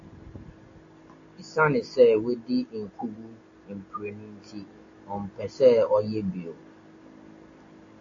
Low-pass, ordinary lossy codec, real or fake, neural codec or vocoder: 7.2 kHz; AAC, 48 kbps; real; none